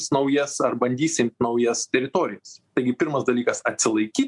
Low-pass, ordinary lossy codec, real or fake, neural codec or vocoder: 10.8 kHz; MP3, 64 kbps; real; none